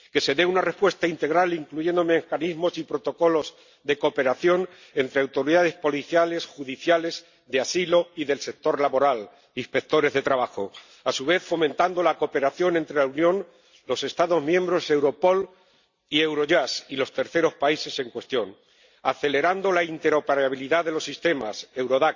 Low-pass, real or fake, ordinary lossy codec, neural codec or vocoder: 7.2 kHz; real; Opus, 64 kbps; none